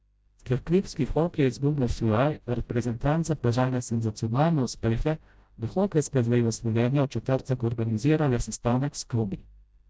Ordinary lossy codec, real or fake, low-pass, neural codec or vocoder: none; fake; none; codec, 16 kHz, 0.5 kbps, FreqCodec, smaller model